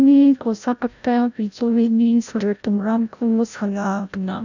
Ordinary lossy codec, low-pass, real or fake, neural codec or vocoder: none; 7.2 kHz; fake; codec, 16 kHz, 0.5 kbps, FreqCodec, larger model